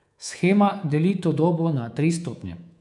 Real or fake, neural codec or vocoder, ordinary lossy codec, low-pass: fake; codec, 24 kHz, 3.1 kbps, DualCodec; none; 10.8 kHz